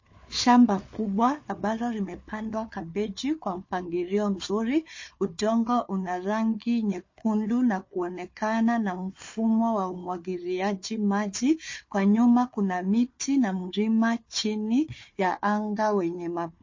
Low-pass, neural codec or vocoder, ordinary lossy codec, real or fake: 7.2 kHz; codec, 16 kHz, 4 kbps, FunCodec, trained on Chinese and English, 50 frames a second; MP3, 32 kbps; fake